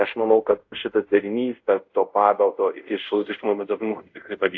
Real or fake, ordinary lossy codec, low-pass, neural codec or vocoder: fake; AAC, 48 kbps; 7.2 kHz; codec, 24 kHz, 0.5 kbps, DualCodec